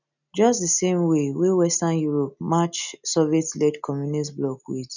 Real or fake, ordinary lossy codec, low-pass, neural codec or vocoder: real; none; 7.2 kHz; none